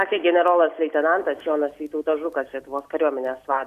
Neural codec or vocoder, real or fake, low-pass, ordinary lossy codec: none; real; 14.4 kHz; AAC, 96 kbps